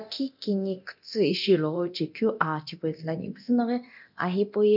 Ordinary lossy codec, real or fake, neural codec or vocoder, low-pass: none; fake; codec, 24 kHz, 0.9 kbps, DualCodec; 5.4 kHz